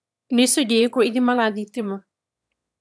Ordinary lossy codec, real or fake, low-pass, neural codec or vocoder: none; fake; none; autoencoder, 22.05 kHz, a latent of 192 numbers a frame, VITS, trained on one speaker